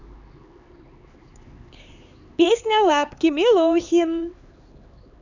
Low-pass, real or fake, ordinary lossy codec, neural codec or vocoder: 7.2 kHz; fake; none; codec, 16 kHz, 4 kbps, X-Codec, HuBERT features, trained on LibriSpeech